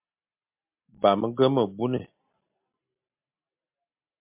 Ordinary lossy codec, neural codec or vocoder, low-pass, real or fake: MP3, 32 kbps; none; 3.6 kHz; real